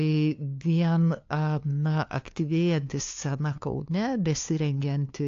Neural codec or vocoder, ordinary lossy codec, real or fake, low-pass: codec, 16 kHz, 2 kbps, FunCodec, trained on LibriTTS, 25 frames a second; AAC, 48 kbps; fake; 7.2 kHz